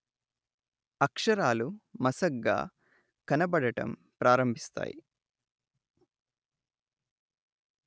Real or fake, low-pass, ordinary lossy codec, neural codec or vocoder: real; none; none; none